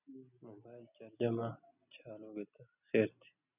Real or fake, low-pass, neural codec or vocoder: real; 3.6 kHz; none